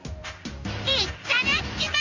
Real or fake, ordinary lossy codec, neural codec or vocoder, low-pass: fake; none; codec, 16 kHz in and 24 kHz out, 1 kbps, XY-Tokenizer; 7.2 kHz